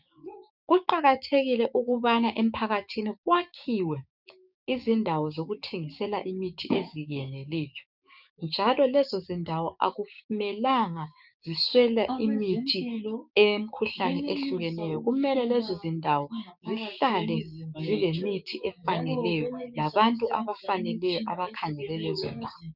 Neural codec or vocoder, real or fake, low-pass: codec, 16 kHz, 6 kbps, DAC; fake; 5.4 kHz